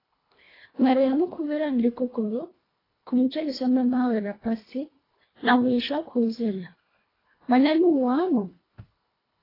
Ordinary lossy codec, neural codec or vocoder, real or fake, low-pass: AAC, 24 kbps; codec, 24 kHz, 1.5 kbps, HILCodec; fake; 5.4 kHz